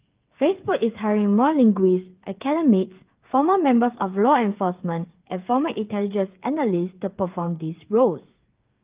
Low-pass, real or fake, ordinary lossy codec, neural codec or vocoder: 3.6 kHz; fake; Opus, 32 kbps; codec, 16 kHz, 8 kbps, FreqCodec, smaller model